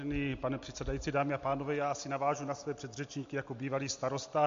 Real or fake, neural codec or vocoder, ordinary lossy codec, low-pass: real; none; MP3, 48 kbps; 7.2 kHz